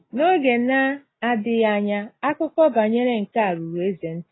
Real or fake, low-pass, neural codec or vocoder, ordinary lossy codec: real; 7.2 kHz; none; AAC, 16 kbps